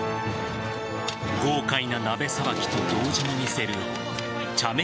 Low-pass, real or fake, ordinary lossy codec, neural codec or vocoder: none; real; none; none